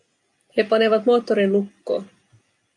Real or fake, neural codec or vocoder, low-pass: real; none; 10.8 kHz